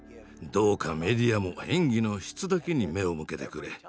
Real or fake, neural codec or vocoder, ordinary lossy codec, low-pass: real; none; none; none